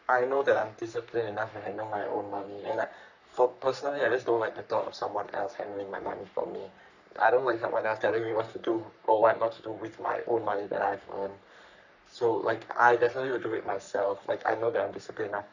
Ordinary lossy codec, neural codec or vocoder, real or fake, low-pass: none; codec, 44.1 kHz, 3.4 kbps, Pupu-Codec; fake; 7.2 kHz